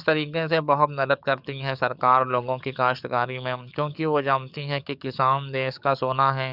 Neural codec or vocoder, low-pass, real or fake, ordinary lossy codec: codec, 44.1 kHz, 7.8 kbps, DAC; 5.4 kHz; fake; none